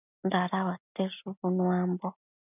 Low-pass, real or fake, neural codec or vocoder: 3.6 kHz; real; none